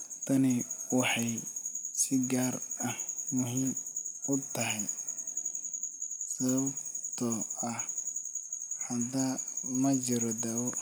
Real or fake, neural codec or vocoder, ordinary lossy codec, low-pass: real; none; none; none